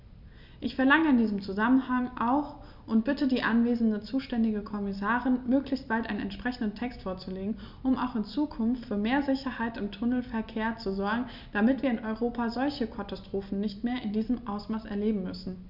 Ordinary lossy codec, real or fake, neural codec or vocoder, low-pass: none; real; none; 5.4 kHz